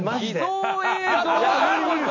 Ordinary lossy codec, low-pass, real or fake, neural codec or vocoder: none; 7.2 kHz; real; none